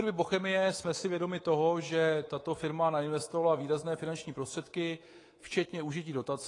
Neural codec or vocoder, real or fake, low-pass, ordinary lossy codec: codec, 24 kHz, 3.1 kbps, DualCodec; fake; 10.8 kHz; AAC, 32 kbps